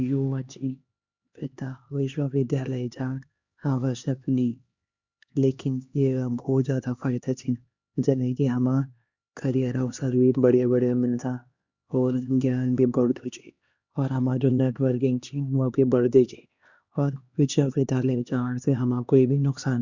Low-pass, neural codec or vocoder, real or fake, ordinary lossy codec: 7.2 kHz; codec, 16 kHz, 2 kbps, X-Codec, HuBERT features, trained on LibriSpeech; fake; Opus, 64 kbps